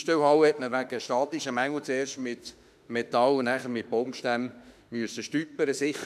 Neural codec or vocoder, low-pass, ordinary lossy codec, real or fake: autoencoder, 48 kHz, 32 numbers a frame, DAC-VAE, trained on Japanese speech; 14.4 kHz; none; fake